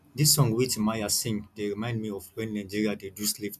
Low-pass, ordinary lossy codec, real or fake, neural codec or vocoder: 14.4 kHz; none; real; none